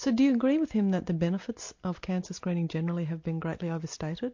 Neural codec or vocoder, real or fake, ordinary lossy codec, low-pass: none; real; MP3, 48 kbps; 7.2 kHz